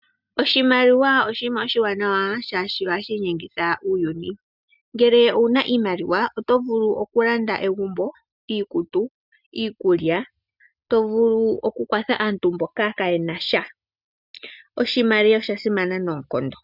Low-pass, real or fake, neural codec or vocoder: 5.4 kHz; real; none